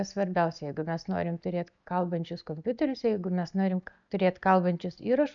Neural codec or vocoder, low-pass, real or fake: none; 7.2 kHz; real